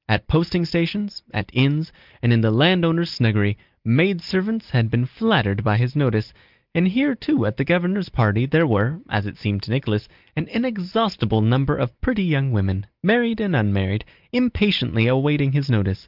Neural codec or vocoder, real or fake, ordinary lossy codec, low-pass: none; real; Opus, 24 kbps; 5.4 kHz